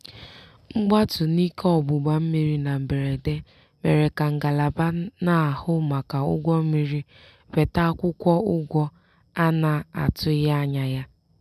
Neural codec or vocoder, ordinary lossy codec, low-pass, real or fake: none; none; 14.4 kHz; real